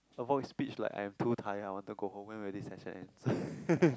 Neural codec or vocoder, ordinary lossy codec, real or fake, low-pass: none; none; real; none